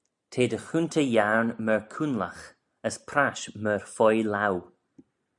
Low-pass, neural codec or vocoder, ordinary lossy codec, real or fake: 10.8 kHz; none; MP3, 96 kbps; real